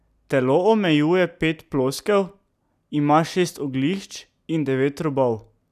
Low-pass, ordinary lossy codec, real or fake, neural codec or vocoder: 14.4 kHz; none; real; none